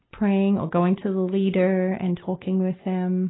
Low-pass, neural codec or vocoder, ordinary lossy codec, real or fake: 7.2 kHz; none; AAC, 16 kbps; real